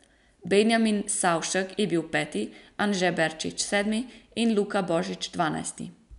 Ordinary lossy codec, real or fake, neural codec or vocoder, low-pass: none; real; none; 10.8 kHz